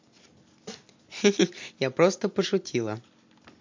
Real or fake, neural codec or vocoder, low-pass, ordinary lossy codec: real; none; 7.2 kHz; MP3, 48 kbps